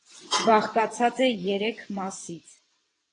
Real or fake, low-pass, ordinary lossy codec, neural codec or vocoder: fake; 9.9 kHz; AAC, 32 kbps; vocoder, 22.05 kHz, 80 mel bands, WaveNeXt